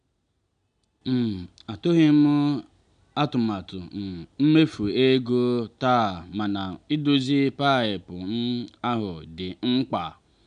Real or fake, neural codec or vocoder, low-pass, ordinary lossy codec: real; none; 9.9 kHz; none